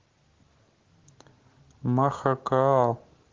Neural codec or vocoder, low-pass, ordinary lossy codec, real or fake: none; 7.2 kHz; Opus, 16 kbps; real